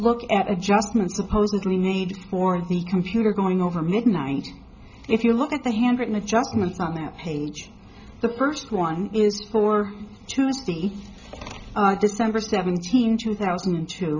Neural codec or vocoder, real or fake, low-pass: none; real; 7.2 kHz